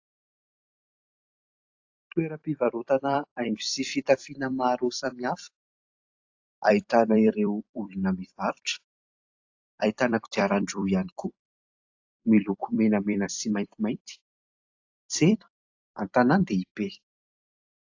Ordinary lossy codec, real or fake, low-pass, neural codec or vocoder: AAC, 48 kbps; fake; 7.2 kHz; vocoder, 44.1 kHz, 128 mel bands every 256 samples, BigVGAN v2